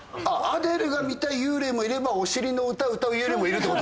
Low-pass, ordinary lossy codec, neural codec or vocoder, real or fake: none; none; none; real